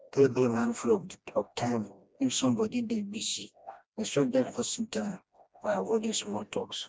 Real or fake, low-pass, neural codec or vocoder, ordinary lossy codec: fake; none; codec, 16 kHz, 1 kbps, FreqCodec, smaller model; none